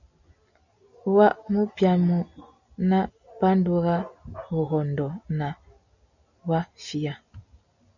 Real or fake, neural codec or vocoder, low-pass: real; none; 7.2 kHz